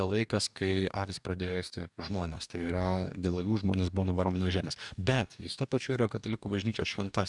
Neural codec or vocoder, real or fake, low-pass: codec, 44.1 kHz, 2.6 kbps, DAC; fake; 10.8 kHz